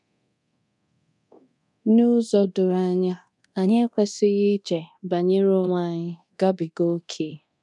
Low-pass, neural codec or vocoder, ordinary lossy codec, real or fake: 10.8 kHz; codec, 24 kHz, 0.9 kbps, DualCodec; none; fake